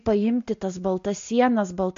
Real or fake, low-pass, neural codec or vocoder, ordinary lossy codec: real; 7.2 kHz; none; MP3, 48 kbps